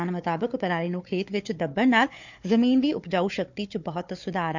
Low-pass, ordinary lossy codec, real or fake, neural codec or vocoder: 7.2 kHz; none; fake; codec, 16 kHz, 4 kbps, FunCodec, trained on Chinese and English, 50 frames a second